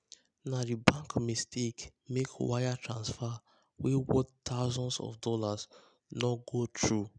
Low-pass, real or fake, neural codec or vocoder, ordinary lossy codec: 9.9 kHz; real; none; none